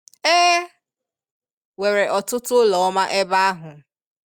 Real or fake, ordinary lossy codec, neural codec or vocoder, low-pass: real; Opus, 64 kbps; none; 19.8 kHz